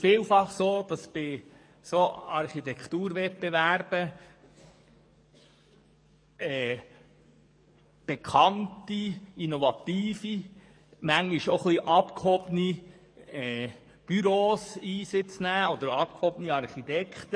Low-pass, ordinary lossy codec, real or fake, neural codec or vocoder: 9.9 kHz; MP3, 48 kbps; fake; codec, 44.1 kHz, 7.8 kbps, DAC